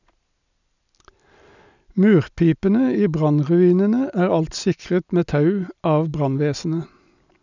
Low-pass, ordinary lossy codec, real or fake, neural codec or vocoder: 7.2 kHz; none; real; none